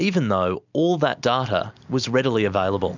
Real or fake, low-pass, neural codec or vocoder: real; 7.2 kHz; none